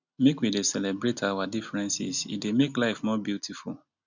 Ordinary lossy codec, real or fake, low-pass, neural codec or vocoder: none; real; 7.2 kHz; none